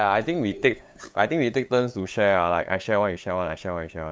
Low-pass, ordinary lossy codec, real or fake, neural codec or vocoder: none; none; fake; codec, 16 kHz, 8 kbps, FunCodec, trained on LibriTTS, 25 frames a second